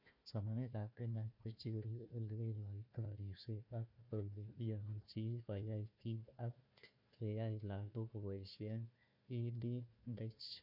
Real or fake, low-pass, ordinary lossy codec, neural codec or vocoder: fake; 5.4 kHz; MP3, 32 kbps; codec, 16 kHz, 1 kbps, FunCodec, trained on Chinese and English, 50 frames a second